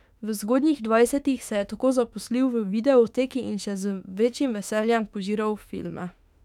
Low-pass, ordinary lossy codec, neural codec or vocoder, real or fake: 19.8 kHz; none; autoencoder, 48 kHz, 32 numbers a frame, DAC-VAE, trained on Japanese speech; fake